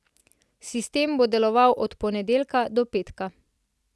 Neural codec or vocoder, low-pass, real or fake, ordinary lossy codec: none; none; real; none